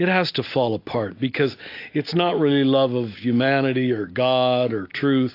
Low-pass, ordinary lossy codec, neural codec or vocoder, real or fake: 5.4 kHz; AAC, 32 kbps; none; real